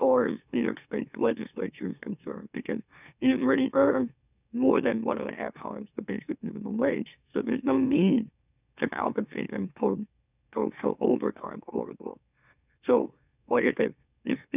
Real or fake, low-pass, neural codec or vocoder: fake; 3.6 kHz; autoencoder, 44.1 kHz, a latent of 192 numbers a frame, MeloTTS